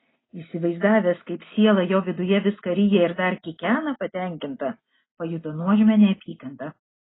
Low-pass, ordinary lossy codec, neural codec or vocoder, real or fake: 7.2 kHz; AAC, 16 kbps; none; real